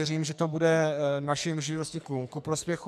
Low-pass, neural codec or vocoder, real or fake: 14.4 kHz; codec, 44.1 kHz, 2.6 kbps, SNAC; fake